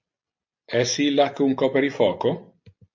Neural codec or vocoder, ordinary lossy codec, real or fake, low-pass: none; MP3, 48 kbps; real; 7.2 kHz